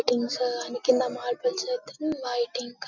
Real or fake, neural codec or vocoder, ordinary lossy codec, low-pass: real; none; none; 7.2 kHz